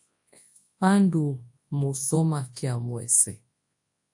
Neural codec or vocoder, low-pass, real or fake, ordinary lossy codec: codec, 24 kHz, 0.9 kbps, WavTokenizer, large speech release; 10.8 kHz; fake; AAC, 64 kbps